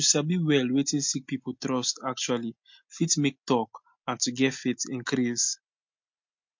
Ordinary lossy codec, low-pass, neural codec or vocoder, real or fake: MP3, 48 kbps; 7.2 kHz; none; real